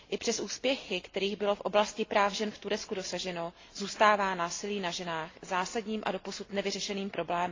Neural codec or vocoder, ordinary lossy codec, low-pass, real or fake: none; AAC, 32 kbps; 7.2 kHz; real